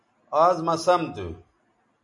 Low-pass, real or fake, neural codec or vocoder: 10.8 kHz; real; none